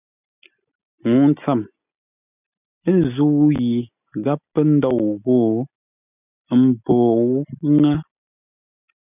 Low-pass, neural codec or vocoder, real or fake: 3.6 kHz; none; real